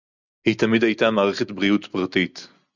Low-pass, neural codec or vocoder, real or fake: 7.2 kHz; none; real